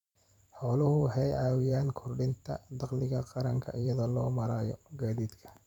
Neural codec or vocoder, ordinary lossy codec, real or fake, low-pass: vocoder, 44.1 kHz, 128 mel bands every 512 samples, BigVGAN v2; none; fake; 19.8 kHz